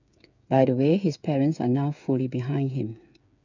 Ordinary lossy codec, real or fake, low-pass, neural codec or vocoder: none; fake; 7.2 kHz; codec, 16 kHz, 8 kbps, FreqCodec, smaller model